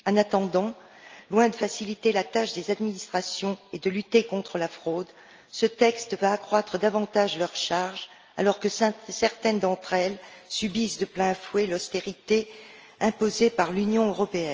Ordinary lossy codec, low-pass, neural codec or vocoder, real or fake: Opus, 24 kbps; 7.2 kHz; none; real